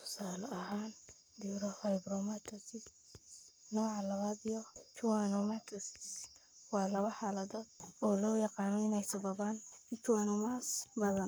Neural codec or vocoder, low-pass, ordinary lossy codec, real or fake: codec, 44.1 kHz, 7.8 kbps, Pupu-Codec; none; none; fake